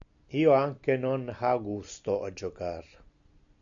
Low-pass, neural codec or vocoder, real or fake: 7.2 kHz; none; real